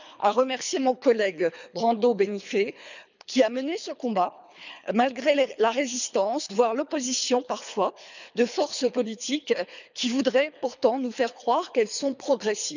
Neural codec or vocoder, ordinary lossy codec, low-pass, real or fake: codec, 24 kHz, 3 kbps, HILCodec; none; 7.2 kHz; fake